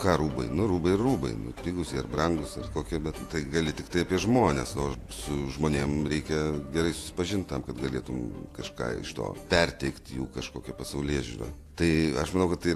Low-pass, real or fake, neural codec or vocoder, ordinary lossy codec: 14.4 kHz; fake; vocoder, 48 kHz, 128 mel bands, Vocos; AAC, 64 kbps